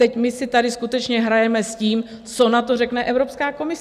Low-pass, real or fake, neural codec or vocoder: 14.4 kHz; real; none